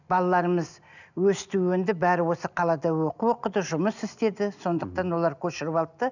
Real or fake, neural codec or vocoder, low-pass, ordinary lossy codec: real; none; 7.2 kHz; none